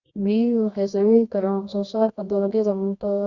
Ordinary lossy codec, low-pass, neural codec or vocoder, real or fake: Opus, 64 kbps; 7.2 kHz; codec, 24 kHz, 0.9 kbps, WavTokenizer, medium music audio release; fake